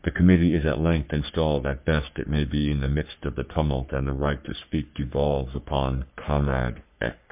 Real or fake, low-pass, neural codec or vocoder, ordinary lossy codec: fake; 3.6 kHz; codec, 44.1 kHz, 3.4 kbps, Pupu-Codec; MP3, 32 kbps